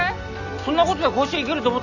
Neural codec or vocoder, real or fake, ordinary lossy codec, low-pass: none; real; none; 7.2 kHz